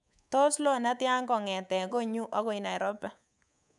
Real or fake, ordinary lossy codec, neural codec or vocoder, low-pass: fake; none; codec, 24 kHz, 3.1 kbps, DualCodec; 10.8 kHz